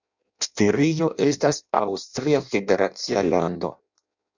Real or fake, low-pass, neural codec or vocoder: fake; 7.2 kHz; codec, 16 kHz in and 24 kHz out, 0.6 kbps, FireRedTTS-2 codec